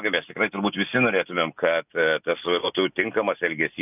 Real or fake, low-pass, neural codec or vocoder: real; 3.6 kHz; none